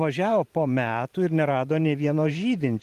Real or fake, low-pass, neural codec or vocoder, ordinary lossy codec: fake; 14.4 kHz; vocoder, 44.1 kHz, 128 mel bands every 512 samples, BigVGAN v2; Opus, 24 kbps